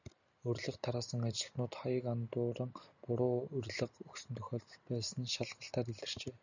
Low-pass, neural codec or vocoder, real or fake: 7.2 kHz; none; real